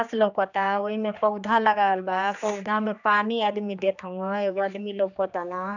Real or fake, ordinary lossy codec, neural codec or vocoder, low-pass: fake; none; codec, 16 kHz, 2 kbps, X-Codec, HuBERT features, trained on general audio; 7.2 kHz